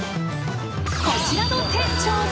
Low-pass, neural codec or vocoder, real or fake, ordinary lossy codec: none; none; real; none